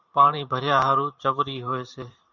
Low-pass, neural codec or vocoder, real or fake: 7.2 kHz; vocoder, 44.1 kHz, 128 mel bands every 512 samples, BigVGAN v2; fake